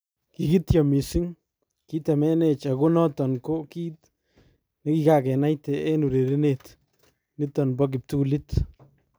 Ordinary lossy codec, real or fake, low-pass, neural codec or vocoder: none; real; none; none